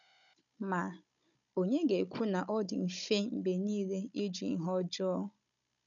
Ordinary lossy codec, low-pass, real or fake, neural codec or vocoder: none; 7.2 kHz; fake; codec, 16 kHz, 16 kbps, FunCodec, trained on Chinese and English, 50 frames a second